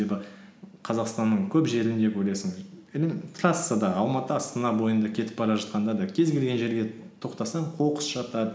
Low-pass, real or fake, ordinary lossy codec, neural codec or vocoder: none; real; none; none